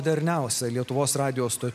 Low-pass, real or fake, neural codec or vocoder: 14.4 kHz; real; none